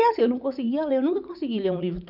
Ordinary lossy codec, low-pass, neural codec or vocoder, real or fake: none; 5.4 kHz; vocoder, 44.1 kHz, 80 mel bands, Vocos; fake